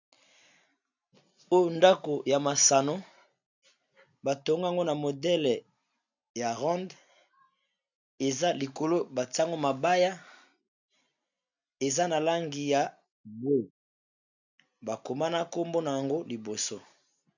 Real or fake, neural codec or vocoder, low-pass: real; none; 7.2 kHz